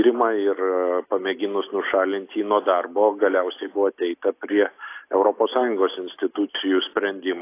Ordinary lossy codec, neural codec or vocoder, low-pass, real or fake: AAC, 24 kbps; none; 3.6 kHz; real